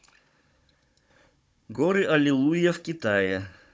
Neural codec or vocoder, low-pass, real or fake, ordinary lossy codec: codec, 16 kHz, 16 kbps, FunCodec, trained on Chinese and English, 50 frames a second; none; fake; none